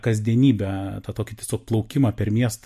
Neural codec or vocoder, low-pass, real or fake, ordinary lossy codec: none; 14.4 kHz; real; MP3, 64 kbps